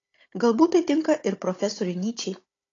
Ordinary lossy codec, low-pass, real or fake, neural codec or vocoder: AAC, 32 kbps; 7.2 kHz; fake; codec, 16 kHz, 16 kbps, FunCodec, trained on Chinese and English, 50 frames a second